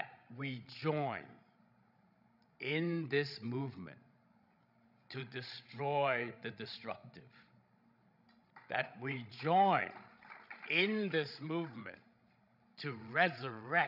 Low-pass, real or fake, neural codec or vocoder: 5.4 kHz; fake; codec, 16 kHz, 16 kbps, FreqCodec, larger model